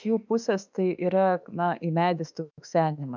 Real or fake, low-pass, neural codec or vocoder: fake; 7.2 kHz; autoencoder, 48 kHz, 32 numbers a frame, DAC-VAE, trained on Japanese speech